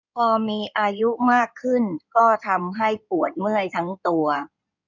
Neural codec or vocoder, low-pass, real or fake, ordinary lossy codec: codec, 16 kHz in and 24 kHz out, 2.2 kbps, FireRedTTS-2 codec; 7.2 kHz; fake; none